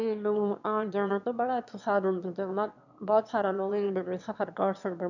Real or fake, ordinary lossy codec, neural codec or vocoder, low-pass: fake; none; autoencoder, 22.05 kHz, a latent of 192 numbers a frame, VITS, trained on one speaker; 7.2 kHz